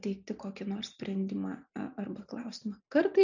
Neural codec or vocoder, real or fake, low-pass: none; real; 7.2 kHz